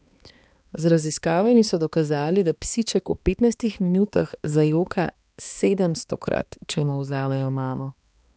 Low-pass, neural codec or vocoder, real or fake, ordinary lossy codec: none; codec, 16 kHz, 2 kbps, X-Codec, HuBERT features, trained on balanced general audio; fake; none